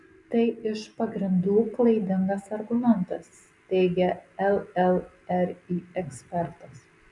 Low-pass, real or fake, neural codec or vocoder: 10.8 kHz; real; none